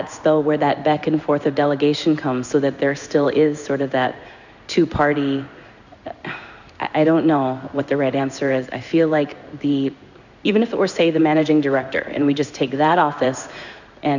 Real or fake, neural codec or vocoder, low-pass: fake; codec, 16 kHz in and 24 kHz out, 1 kbps, XY-Tokenizer; 7.2 kHz